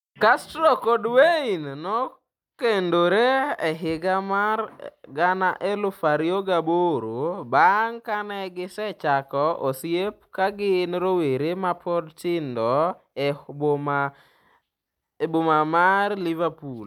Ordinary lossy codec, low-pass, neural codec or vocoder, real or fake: none; 19.8 kHz; none; real